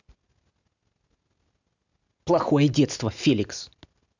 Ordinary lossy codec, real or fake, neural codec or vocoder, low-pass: none; real; none; 7.2 kHz